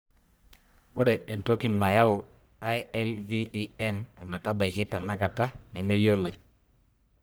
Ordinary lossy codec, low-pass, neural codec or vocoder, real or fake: none; none; codec, 44.1 kHz, 1.7 kbps, Pupu-Codec; fake